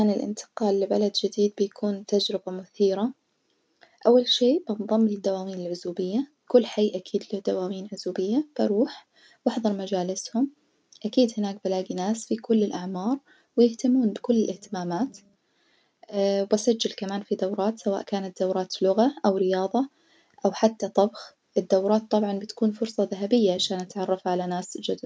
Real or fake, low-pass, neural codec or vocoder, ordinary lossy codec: real; none; none; none